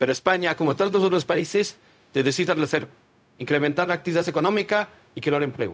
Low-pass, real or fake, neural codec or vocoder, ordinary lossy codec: none; fake; codec, 16 kHz, 0.4 kbps, LongCat-Audio-Codec; none